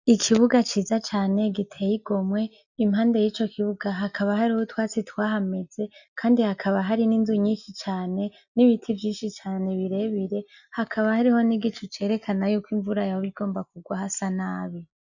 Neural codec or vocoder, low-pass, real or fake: none; 7.2 kHz; real